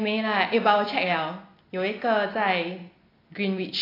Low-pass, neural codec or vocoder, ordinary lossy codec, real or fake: 5.4 kHz; none; AAC, 24 kbps; real